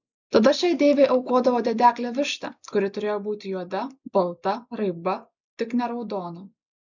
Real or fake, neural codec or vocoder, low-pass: real; none; 7.2 kHz